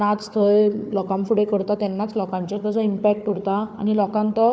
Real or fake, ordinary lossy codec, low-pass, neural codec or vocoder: fake; none; none; codec, 16 kHz, 4 kbps, FunCodec, trained on Chinese and English, 50 frames a second